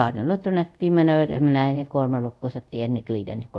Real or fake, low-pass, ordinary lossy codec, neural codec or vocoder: fake; none; none; codec, 24 kHz, 0.5 kbps, DualCodec